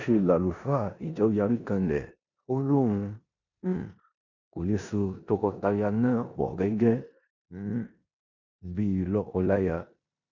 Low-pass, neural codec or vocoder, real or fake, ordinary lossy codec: 7.2 kHz; codec, 16 kHz in and 24 kHz out, 0.9 kbps, LongCat-Audio-Codec, four codebook decoder; fake; none